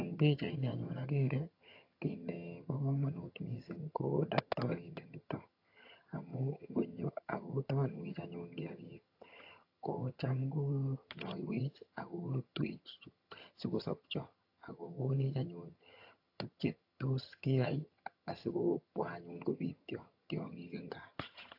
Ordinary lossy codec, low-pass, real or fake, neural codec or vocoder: AAC, 32 kbps; 5.4 kHz; fake; vocoder, 22.05 kHz, 80 mel bands, HiFi-GAN